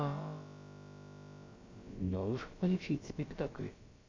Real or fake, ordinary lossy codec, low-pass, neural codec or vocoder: fake; MP3, 48 kbps; 7.2 kHz; codec, 16 kHz, about 1 kbps, DyCAST, with the encoder's durations